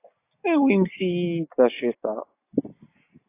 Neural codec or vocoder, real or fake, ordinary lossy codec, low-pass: none; real; AAC, 24 kbps; 3.6 kHz